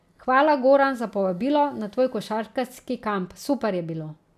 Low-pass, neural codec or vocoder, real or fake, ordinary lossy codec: 14.4 kHz; none; real; none